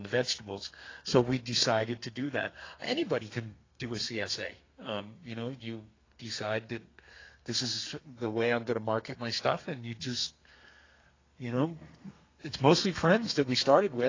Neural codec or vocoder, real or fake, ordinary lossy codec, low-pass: codec, 32 kHz, 1.9 kbps, SNAC; fake; AAC, 32 kbps; 7.2 kHz